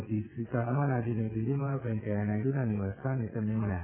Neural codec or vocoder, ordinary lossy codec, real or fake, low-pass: vocoder, 22.05 kHz, 80 mel bands, WaveNeXt; none; fake; 3.6 kHz